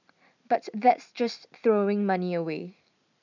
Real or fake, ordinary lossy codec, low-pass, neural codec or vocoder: real; none; 7.2 kHz; none